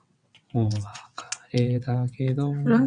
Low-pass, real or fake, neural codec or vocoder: 9.9 kHz; fake; vocoder, 22.05 kHz, 80 mel bands, WaveNeXt